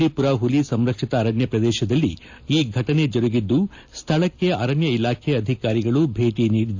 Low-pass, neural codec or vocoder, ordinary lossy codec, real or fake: 7.2 kHz; none; AAC, 48 kbps; real